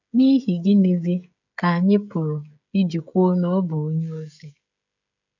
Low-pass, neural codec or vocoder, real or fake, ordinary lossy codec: 7.2 kHz; codec, 16 kHz, 16 kbps, FreqCodec, smaller model; fake; none